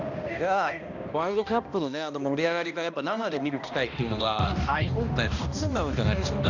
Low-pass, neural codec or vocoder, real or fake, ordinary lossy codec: 7.2 kHz; codec, 16 kHz, 1 kbps, X-Codec, HuBERT features, trained on balanced general audio; fake; none